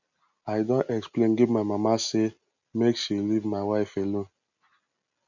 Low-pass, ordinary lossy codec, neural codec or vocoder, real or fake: 7.2 kHz; none; none; real